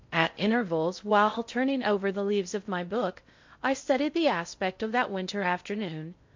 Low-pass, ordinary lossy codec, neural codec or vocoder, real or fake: 7.2 kHz; MP3, 48 kbps; codec, 16 kHz in and 24 kHz out, 0.6 kbps, FocalCodec, streaming, 4096 codes; fake